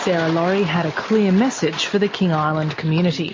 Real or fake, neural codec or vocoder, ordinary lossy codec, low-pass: real; none; MP3, 32 kbps; 7.2 kHz